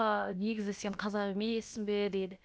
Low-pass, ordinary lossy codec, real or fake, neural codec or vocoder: none; none; fake; codec, 16 kHz, about 1 kbps, DyCAST, with the encoder's durations